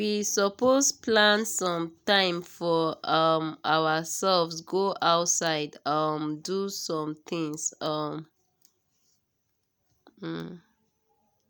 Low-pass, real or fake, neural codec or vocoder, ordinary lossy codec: none; real; none; none